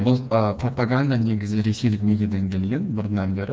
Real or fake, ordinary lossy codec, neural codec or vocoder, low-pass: fake; none; codec, 16 kHz, 2 kbps, FreqCodec, smaller model; none